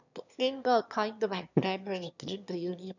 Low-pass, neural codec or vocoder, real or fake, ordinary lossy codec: 7.2 kHz; autoencoder, 22.05 kHz, a latent of 192 numbers a frame, VITS, trained on one speaker; fake; none